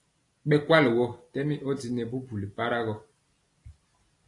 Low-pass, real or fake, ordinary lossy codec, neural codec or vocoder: 10.8 kHz; real; AAC, 48 kbps; none